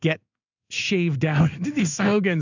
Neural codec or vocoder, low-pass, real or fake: codec, 16 kHz in and 24 kHz out, 1 kbps, XY-Tokenizer; 7.2 kHz; fake